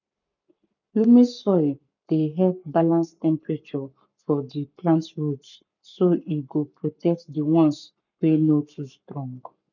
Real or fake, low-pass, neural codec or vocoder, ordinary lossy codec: fake; 7.2 kHz; codec, 44.1 kHz, 7.8 kbps, Pupu-Codec; none